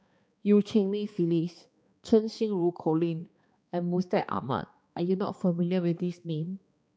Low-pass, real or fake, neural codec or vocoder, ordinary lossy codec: none; fake; codec, 16 kHz, 2 kbps, X-Codec, HuBERT features, trained on balanced general audio; none